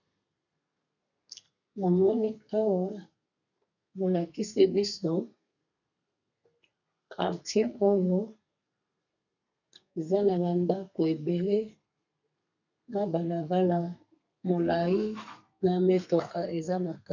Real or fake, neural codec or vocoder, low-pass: fake; codec, 32 kHz, 1.9 kbps, SNAC; 7.2 kHz